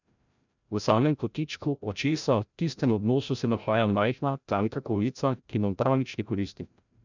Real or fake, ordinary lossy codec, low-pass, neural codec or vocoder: fake; none; 7.2 kHz; codec, 16 kHz, 0.5 kbps, FreqCodec, larger model